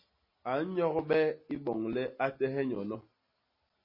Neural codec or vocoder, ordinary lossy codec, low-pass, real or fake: none; MP3, 24 kbps; 5.4 kHz; real